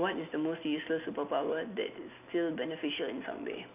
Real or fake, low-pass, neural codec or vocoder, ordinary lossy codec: real; 3.6 kHz; none; none